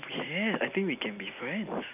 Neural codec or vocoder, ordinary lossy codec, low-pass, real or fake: none; none; 3.6 kHz; real